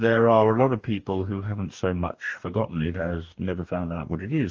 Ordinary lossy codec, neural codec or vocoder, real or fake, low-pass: Opus, 24 kbps; codec, 44.1 kHz, 2.6 kbps, DAC; fake; 7.2 kHz